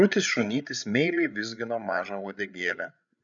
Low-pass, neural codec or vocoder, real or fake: 7.2 kHz; codec, 16 kHz, 8 kbps, FreqCodec, larger model; fake